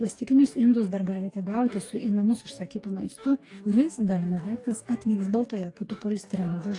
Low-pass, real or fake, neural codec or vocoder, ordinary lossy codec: 10.8 kHz; fake; codec, 44.1 kHz, 2.6 kbps, DAC; AAC, 48 kbps